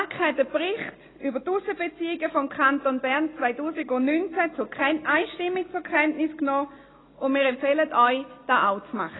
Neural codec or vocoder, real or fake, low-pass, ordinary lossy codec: none; real; 7.2 kHz; AAC, 16 kbps